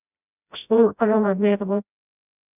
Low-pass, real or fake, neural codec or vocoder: 3.6 kHz; fake; codec, 16 kHz, 0.5 kbps, FreqCodec, smaller model